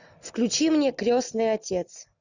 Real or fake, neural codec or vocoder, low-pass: real; none; 7.2 kHz